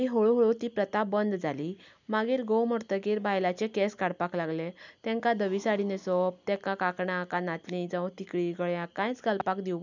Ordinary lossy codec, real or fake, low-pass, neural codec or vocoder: none; real; 7.2 kHz; none